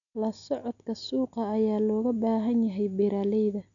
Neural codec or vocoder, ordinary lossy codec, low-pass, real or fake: none; none; 7.2 kHz; real